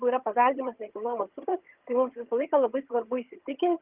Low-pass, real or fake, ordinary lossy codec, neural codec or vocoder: 3.6 kHz; fake; Opus, 32 kbps; vocoder, 22.05 kHz, 80 mel bands, HiFi-GAN